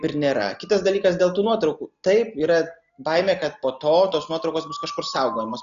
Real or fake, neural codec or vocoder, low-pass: real; none; 7.2 kHz